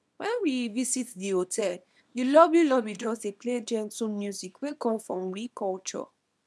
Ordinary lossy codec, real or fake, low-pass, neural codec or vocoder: none; fake; none; codec, 24 kHz, 0.9 kbps, WavTokenizer, small release